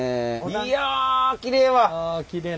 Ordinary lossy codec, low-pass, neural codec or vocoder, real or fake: none; none; none; real